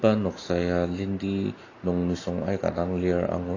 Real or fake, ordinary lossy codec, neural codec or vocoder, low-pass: real; AAC, 32 kbps; none; 7.2 kHz